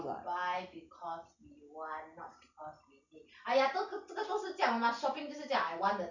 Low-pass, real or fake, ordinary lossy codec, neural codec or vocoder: 7.2 kHz; real; none; none